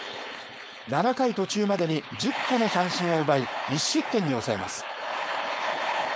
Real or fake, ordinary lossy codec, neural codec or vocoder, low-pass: fake; none; codec, 16 kHz, 4.8 kbps, FACodec; none